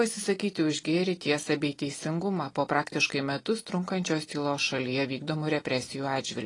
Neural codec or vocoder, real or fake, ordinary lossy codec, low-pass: none; real; AAC, 32 kbps; 10.8 kHz